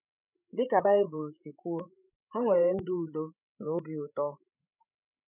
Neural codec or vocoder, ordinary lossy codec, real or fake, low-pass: codec, 16 kHz, 16 kbps, FreqCodec, larger model; none; fake; 3.6 kHz